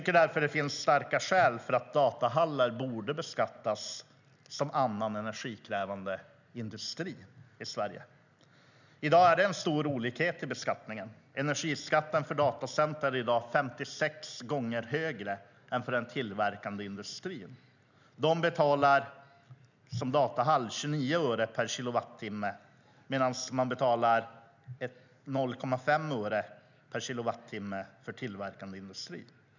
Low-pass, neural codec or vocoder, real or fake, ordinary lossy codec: 7.2 kHz; none; real; none